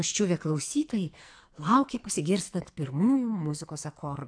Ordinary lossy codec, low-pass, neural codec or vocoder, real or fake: AAC, 64 kbps; 9.9 kHz; codec, 44.1 kHz, 2.6 kbps, SNAC; fake